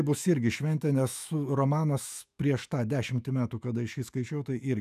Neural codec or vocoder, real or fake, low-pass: autoencoder, 48 kHz, 128 numbers a frame, DAC-VAE, trained on Japanese speech; fake; 14.4 kHz